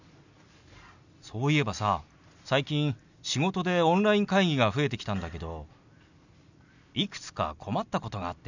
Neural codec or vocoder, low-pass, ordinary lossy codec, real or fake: none; 7.2 kHz; none; real